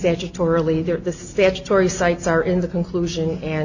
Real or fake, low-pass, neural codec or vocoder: real; 7.2 kHz; none